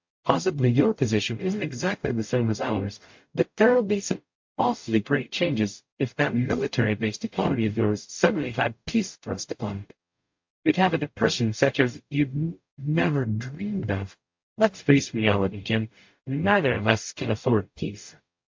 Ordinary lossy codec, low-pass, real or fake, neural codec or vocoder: MP3, 48 kbps; 7.2 kHz; fake; codec, 44.1 kHz, 0.9 kbps, DAC